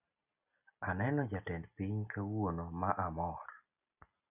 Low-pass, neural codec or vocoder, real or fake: 3.6 kHz; none; real